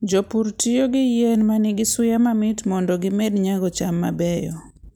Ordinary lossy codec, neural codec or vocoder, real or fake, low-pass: none; none; real; none